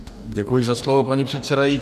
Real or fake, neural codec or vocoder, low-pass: fake; codec, 44.1 kHz, 2.6 kbps, DAC; 14.4 kHz